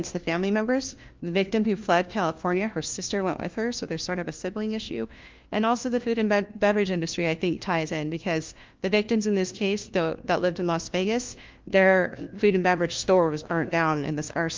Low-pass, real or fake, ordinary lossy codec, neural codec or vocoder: 7.2 kHz; fake; Opus, 32 kbps; codec, 16 kHz, 1 kbps, FunCodec, trained on LibriTTS, 50 frames a second